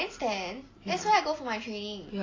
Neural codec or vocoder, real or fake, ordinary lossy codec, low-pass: none; real; AAC, 32 kbps; 7.2 kHz